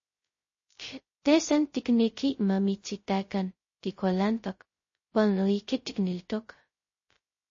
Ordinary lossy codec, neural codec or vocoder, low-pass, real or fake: MP3, 32 kbps; codec, 16 kHz, 0.2 kbps, FocalCodec; 7.2 kHz; fake